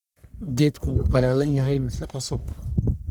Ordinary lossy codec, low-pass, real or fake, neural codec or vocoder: none; none; fake; codec, 44.1 kHz, 1.7 kbps, Pupu-Codec